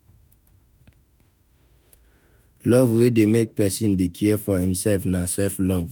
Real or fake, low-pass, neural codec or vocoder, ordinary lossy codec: fake; none; autoencoder, 48 kHz, 32 numbers a frame, DAC-VAE, trained on Japanese speech; none